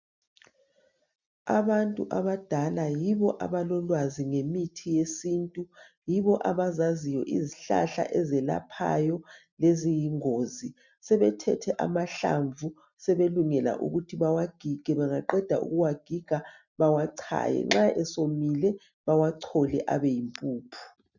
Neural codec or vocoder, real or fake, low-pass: none; real; 7.2 kHz